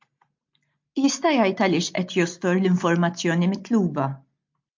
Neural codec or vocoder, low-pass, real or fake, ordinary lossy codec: none; 7.2 kHz; real; MP3, 48 kbps